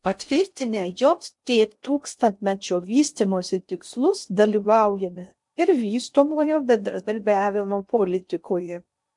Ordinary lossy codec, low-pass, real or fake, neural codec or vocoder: MP3, 64 kbps; 10.8 kHz; fake; codec, 16 kHz in and 24 kHz out, 0.6 kbps, FocalCodec, streaming, 4096 codes